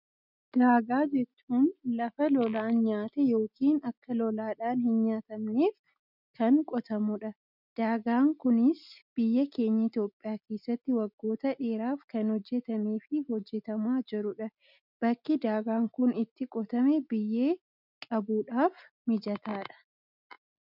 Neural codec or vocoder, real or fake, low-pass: none; real; 5.4 kHz